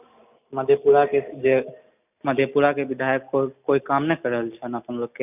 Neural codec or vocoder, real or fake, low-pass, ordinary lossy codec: none; real; 3.6 kHz; none